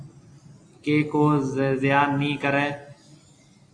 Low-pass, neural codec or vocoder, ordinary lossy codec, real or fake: 9.9 kHz; none; AAC, 48 kbps; real